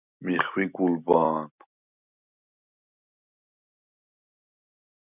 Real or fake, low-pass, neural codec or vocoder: real; 3.6 kHz; none